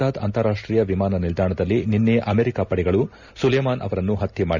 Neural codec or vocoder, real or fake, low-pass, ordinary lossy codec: none; real; 7.2 kHz; none